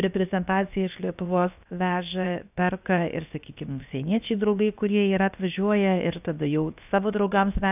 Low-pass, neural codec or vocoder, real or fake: 3.6 kHz; codec, 16 kHz, 0.7 kbps, FocalCodec; fake